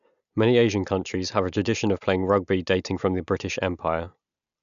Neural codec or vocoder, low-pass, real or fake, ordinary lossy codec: none; 7.2 kHz; real; none